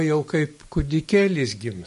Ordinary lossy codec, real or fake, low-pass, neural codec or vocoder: MP3, 48 kbps; real; 14.4 kHz; none